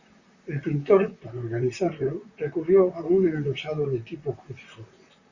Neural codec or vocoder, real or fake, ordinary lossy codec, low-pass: vocoder, 44.1 kHz, 128 mel bands, Pupu-Vocoder; fake; Opus, 64 kbps; 7.2 kHz